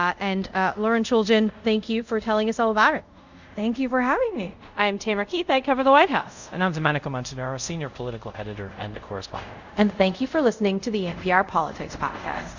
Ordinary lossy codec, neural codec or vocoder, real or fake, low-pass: Opus, 64 kbps; codec, 24 kHz, 0.5 kbps, DualCodec; fake; 7.2 kHz